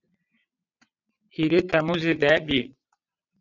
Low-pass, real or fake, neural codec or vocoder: 7.2 kHz; fake; vocoder, 22.05 kHz, 80 mel bands, WaveNeXt